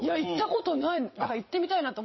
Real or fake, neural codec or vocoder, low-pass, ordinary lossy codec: fake; vocoder, 22.05 kHz, 80 mel bands, Vocos; 7.2 kHz; MP3, 24 kbps